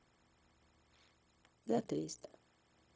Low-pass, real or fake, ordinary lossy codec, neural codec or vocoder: none; fake; none; codec, 16 kHz, 0.4 kbps, LongCat-Audio-Codec